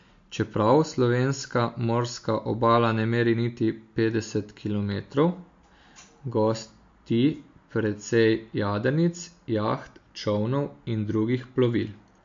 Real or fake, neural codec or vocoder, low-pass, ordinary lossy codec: real; none; 7.2 kHz; MP3, 48 kbps